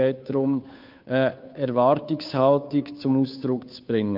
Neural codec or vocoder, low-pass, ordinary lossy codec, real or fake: codec, 16 kHz, 2 kbps, FunCodec, trained on Chinese and English, 25 frames a second; 5.4 kHz; MP3, 48 kbps; fake